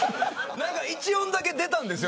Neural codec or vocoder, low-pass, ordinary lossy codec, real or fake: none; none; none; real